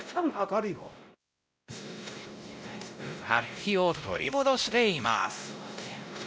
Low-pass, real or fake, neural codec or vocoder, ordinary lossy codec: none; fake; codec, 16 kHz, 0.5 kbps, X-Codec, WavLM features, trained on Multilingual LibriSpeech; none